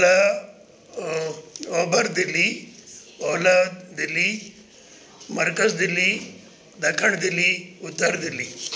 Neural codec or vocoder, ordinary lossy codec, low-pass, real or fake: none; none; none; real